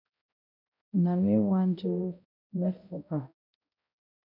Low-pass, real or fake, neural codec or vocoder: 5.4 kHz; fake; codec, 16 kHz, 0.5 kbps, X-Codec, HuBERT features, trained on balanced general audio